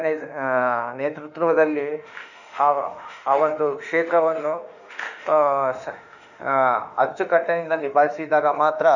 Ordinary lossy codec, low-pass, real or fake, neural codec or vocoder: none; 7.2 kHz; fake; autoencoder, 48 kHz, 32 numbers a frame, DAC-VAE, trained on Japanese speech